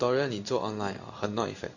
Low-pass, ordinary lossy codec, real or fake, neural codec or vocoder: 7.2 kHz; none; fake; codec, 16 kHz in and 24 kHz out, 1 kbps, XY-Tokenizer